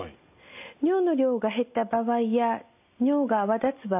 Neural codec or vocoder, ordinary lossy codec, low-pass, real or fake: none; AAC, 32 kbps; 3.6 kHz; real